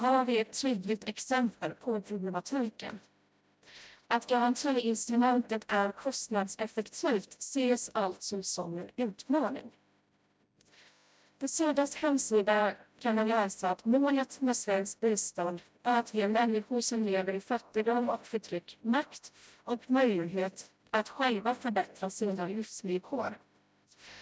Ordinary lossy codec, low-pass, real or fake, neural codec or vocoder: none; none; fake; codec, 16 kHz, 0.5 kbps, FreqCodec, smaller model